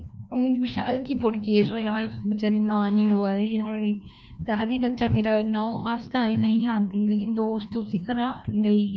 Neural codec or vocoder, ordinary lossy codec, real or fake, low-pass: codec, 16 kHz, 1 kbps, FreqCodec, larger model; none; fake; none